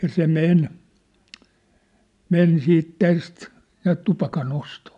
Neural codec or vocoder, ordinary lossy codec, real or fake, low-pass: none; none; real; 10.8 kHz